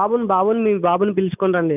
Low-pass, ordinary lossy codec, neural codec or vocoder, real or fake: 3.6 kHz; none; none; real